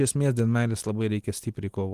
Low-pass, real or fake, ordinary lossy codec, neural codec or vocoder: 14.4 kHz; real; Opus, 24 kbps; none